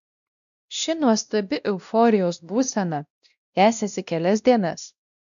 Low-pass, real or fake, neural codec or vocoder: 7.2 kHz; fake; codec, 16 kHz, 1 kbps, X-Codec, WavLM features, trained on Multilingual LibriSpeech